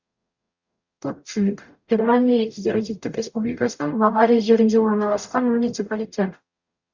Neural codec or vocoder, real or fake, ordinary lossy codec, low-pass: codec, 44.1 kHz, 0.9 kbps, DAC; fake; Opus, 64 kbps; 7.2 kHz